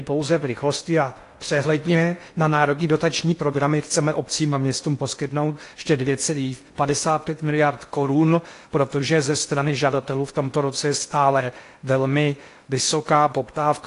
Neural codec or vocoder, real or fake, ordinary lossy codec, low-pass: codec, 16 kHz in and 24 kHz out, 0.6 kbps, FocalCodec, streaming, 2048 codes; fake; AAC, 48 kbps; 10.8 kHz